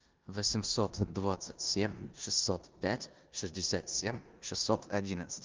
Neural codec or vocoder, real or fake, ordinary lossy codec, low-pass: codec, 16 kHz in and 24 kHz out, 0.9 kbps, LongCat-Audio-Codec, four codebook decoder; fake; Opus, 32 kbps; 7.2 kHz